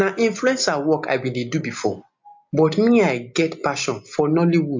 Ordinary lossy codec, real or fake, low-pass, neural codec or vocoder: MP3, 48 kbps; real; 7.2 kHz; none